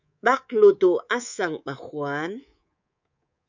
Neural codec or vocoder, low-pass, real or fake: codec, 24 kHz, 3.1 kbps, DualCodec; 7.2 kHz; fake